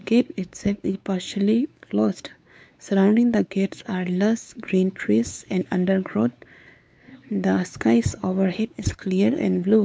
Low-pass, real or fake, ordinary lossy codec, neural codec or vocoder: none; fake; none; codec, 16 kHz, 4 kbps, X-Codec, WavLM features, trained on Multilingual LibriSpeech